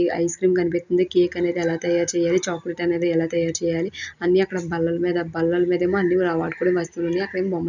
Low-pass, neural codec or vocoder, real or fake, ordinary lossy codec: 7.2 kHz; none; real; none